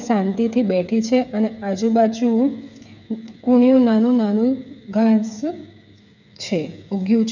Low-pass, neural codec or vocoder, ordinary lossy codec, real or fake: 7.2 kHz; codec, 16 kHz, 8 kbps, FreqCodec, smaller model; none; fake